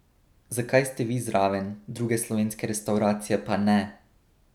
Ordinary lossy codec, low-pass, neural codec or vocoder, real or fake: none; 19.8 kHz; none; real